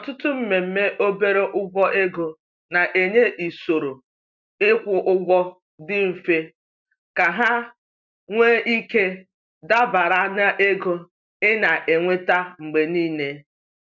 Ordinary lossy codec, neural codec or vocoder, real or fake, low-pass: none; none; real; 7.2 kHz